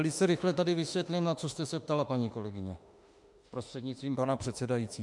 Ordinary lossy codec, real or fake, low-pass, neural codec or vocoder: MP3, 64 kbps; fake; 10.8 kHz; autoencoder, 48 kHz, 32 numbers a frame, DAC-VAE, trained on Japanese speech